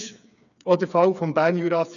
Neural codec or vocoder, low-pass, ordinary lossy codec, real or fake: codec, 16 kHz, 4 kbps, FreqCodec, smaller model; 7.2 kHz; none; fake